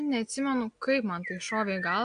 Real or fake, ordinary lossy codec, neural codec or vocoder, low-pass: real; AAC, 64 kbps; none; 9.9 kHz